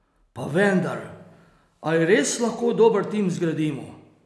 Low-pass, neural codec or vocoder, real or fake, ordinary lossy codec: none; none; real; none